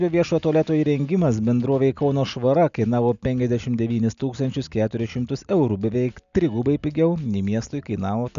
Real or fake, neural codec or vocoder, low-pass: real; none; 7.2 kHz